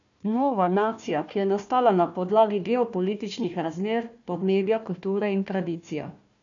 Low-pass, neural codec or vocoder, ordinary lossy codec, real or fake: 7.2 kHz; codec, 16 kHz, 1 kbps, FunCodec, trained on Chinese and English, 50 frames a second; none; fake